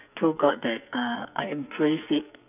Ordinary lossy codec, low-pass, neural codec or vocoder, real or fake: none; 3.6 kHz; codec, 44.1 kHz, 2.6 kbps, SNAC; fake